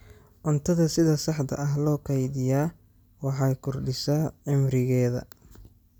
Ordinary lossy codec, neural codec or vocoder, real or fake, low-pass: none; none; real; none